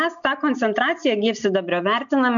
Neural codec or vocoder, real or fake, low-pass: none; real; 7.2 kHz